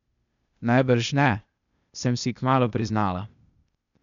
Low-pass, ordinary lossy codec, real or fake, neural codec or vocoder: 7.2 kHz; none; fake; codec, 16 kHz, 0.8 kbps, ZipCodec